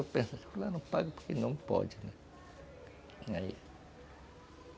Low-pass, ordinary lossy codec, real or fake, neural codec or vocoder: none; none; real; none